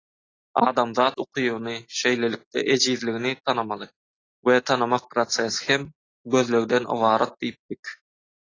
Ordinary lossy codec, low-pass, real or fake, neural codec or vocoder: AAC, 32 kbps; 7.2 kHz; real; none